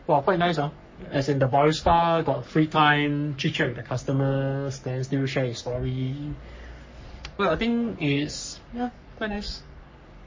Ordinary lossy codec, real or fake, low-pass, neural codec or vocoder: MP3, 32 kbps; fake; 7.2 kHz; codec, 44.1 kHz, 3.4 kbps, Pupu-Codec